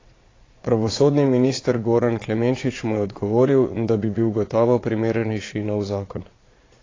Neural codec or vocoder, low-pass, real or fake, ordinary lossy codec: vocoder, 22.05 kHz, 80 mel bands, Vocos; 7.2 kHz; fake; AAC, 32 kbps